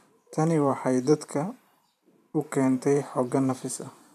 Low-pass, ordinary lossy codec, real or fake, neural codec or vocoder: 14.4 kHz; none; fake; vocoder, 44.1 kHz, 128 mel bands every 512 samples, BigVGAN v2